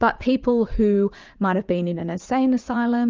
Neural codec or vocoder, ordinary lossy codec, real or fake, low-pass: vocoder, 44.1 kHz, 80 mel bands, Vocos; Opus, 24 kbps; fake; 7.2 kHz